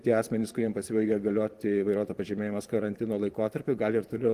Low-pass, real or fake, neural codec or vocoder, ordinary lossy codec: 14.4 kHz; fake; vocoder, 44.1 kHz, 128 mel bands every 512 samples, BigVGAN v2; Opus, 24 kbps